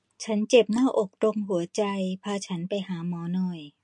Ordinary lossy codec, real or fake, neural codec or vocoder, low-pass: MP3, 48 kbps; real; none; 10.8 kHz